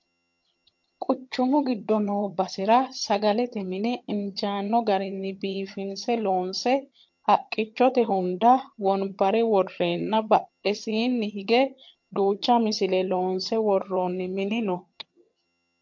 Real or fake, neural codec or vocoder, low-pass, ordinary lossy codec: fake; vocoder, 22.05 kHz, 80 mel bands, HiFi-GAN; 7.2 kHz; MP3, 48 kbps